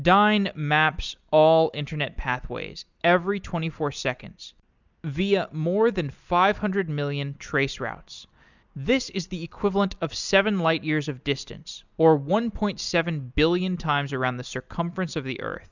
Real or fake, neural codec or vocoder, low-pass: real; none; 7.2 kHz